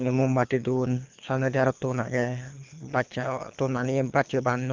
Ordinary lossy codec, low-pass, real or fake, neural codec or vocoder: Opus, 32 kbps; 7.2 kHz; fake; codec, 24 kHz, 3 kbps, HILCodec